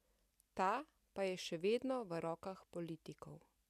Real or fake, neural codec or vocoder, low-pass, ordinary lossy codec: real; none; 14.4 kHz; none